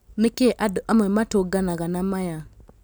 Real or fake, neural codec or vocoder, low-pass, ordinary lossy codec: real; none; none; none